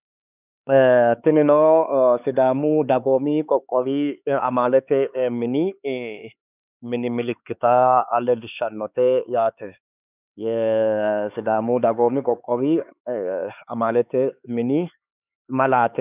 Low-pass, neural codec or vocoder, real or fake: 3.6 kHz; codec, 16 kHz, 4 kbps, X-Codec, HuBERT features, trained on LibriSpeech; fake